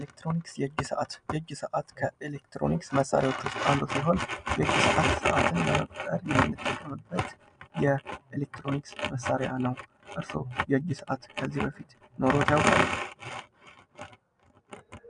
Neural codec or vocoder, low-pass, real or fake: none; 9.9 kHz; real